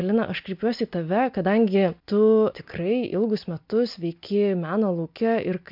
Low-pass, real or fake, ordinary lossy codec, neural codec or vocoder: 5.4 kHz; real; MP3, 48 kbps; none